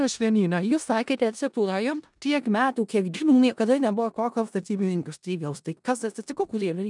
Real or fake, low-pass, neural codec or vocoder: fake; 10.8 kHz; codec, 16 kHz in and 24 kHz out, 0.4 kbps, LongCat-Audio-Codec, four codebook decoder